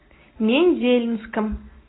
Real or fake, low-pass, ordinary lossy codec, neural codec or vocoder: real; 7.2 kHz; AAC, 16 kbps; none